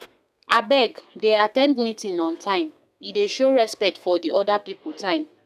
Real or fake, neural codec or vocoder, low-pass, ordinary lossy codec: fake; codec, 32 kHz, 1.9 kbps, SNAC; 14.4 kHz; none